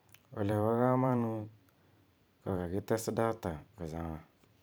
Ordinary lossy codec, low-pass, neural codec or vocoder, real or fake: none; none; vocoder, 44.1 kHz, 128 mel bands every 512 samples, BigVGAN v2; fake